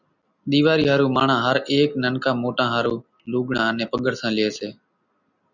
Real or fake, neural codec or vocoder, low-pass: real; none; 7.2 kHz